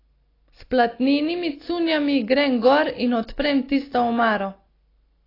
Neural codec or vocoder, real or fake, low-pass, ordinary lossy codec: none; real; 5.4 kHz; AAC, 24 kbps